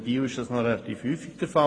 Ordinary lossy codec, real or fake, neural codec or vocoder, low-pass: AAC, 32 kbps; real; none; 9.9 kHz